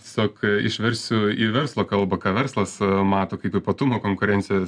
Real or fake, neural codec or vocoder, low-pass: real; none; 9.9 kHz